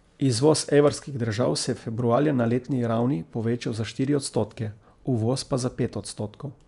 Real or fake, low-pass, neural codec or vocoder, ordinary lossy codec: fake; 10.8 kHz; vocoder, 24 kHz, 100 mel bands, Vocos; none